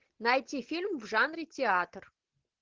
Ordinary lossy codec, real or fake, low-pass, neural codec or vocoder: Opus, 16 kbps; real; 7.2 kHz; none